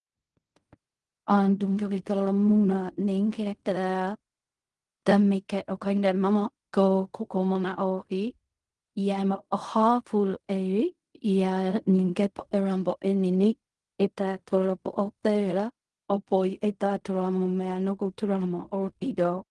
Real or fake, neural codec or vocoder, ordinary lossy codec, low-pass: fake; codec, 16 kHz in and 24 kHz out, 0.4 kbps, LongCat-Audio-Codec, fine tuned four codebook decoder; Opus, 24 kbps; 10.8 kHz